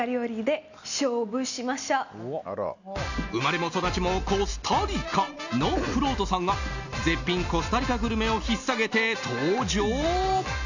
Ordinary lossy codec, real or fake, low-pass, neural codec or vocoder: none; real; 7.2 kHz; none